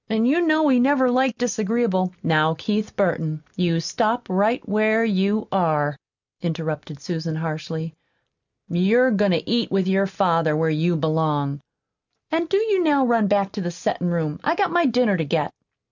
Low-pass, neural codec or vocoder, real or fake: 7.2 kHz; none; real